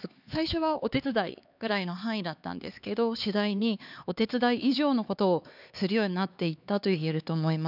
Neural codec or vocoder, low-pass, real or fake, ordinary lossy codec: codec, 16 kHz, 4 kbps, X-Codec, HuBERT features, trained on LibriSpeech; 5.4 kHz; fake; none